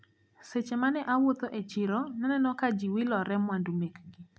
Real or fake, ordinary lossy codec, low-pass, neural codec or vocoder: real; none; none; none